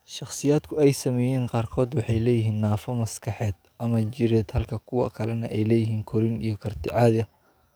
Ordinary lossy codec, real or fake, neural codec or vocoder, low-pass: none; fake; codec, 44.1 kHz, 7.8 kbps, DAC; none